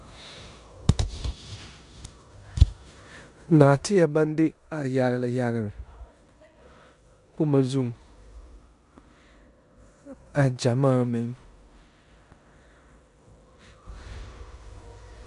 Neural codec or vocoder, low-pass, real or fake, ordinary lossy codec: codec, 16 kHz in and 24 kHz out, 0.9 kbps, LongCat-Audio-Codec, four codebook decoder; 10.8 kHz; fake; MP3, 96 kbps